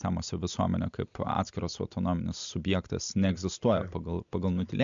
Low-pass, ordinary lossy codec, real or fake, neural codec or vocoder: 7.2 kHz; MP3, 64 kbps; real; none